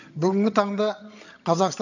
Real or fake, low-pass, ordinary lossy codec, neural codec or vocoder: fake; 7.2 kHz; none; vocoder, 22.05 kHz, 80 mel bands, HiFi-GAN